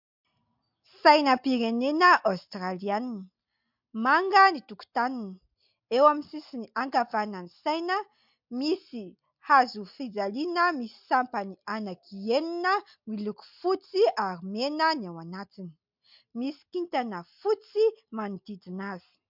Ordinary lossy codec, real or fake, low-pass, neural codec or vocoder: MP3, 48 kbps; real; 5.4 kHz; none